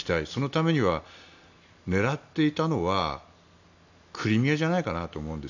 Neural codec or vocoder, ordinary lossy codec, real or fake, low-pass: none; none; real; 7.2 kHz